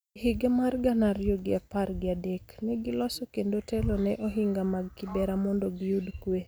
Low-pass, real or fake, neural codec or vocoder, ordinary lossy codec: none; real; none; none